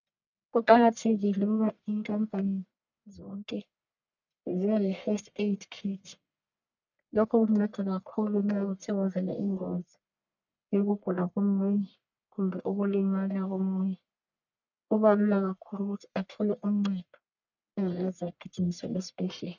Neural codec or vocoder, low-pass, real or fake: codec, 44.1 kHz, 1.7 kbps, Pupu-Codec; 7.2 kHz; fake